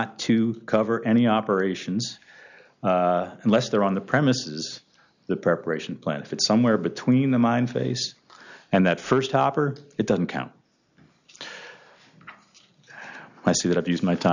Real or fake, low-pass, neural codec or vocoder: real; 7.2 kHz; none